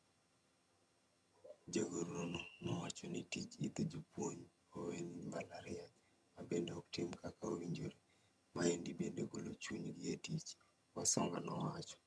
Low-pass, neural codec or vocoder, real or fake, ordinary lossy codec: none; vocoder, 22.05 kHz, 80 mel bands, HiFi-GAN; fake; none